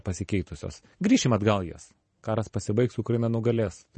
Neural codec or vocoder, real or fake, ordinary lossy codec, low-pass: vocoder, 44.1 kHz, 128 mel bands every 512 samples, BigVGAN v2; fake; MP3, 32 kbps; 10.8 kHz